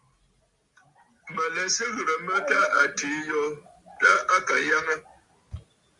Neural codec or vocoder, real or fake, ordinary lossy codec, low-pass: none; real; Opus, 64 kbps; 10.8 kHz